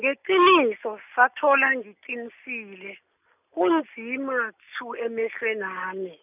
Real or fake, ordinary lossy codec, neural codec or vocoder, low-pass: real; none; none; 3.6 kHz